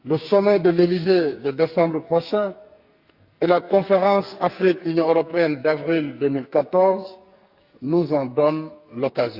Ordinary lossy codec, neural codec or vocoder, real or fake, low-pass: Opus, 64 kbps; codec, 44.1 kHz, 2.6 kbps, SNAC; fake; 5.4 kHz